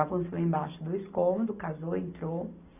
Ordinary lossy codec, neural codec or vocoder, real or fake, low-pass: none; none; real; 3.6 kHz